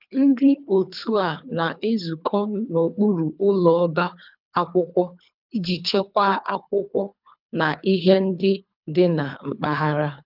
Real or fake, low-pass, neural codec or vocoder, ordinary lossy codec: fake; 5.4 kHz; codec, 24 kHz, 3 kbps, HILCodec; none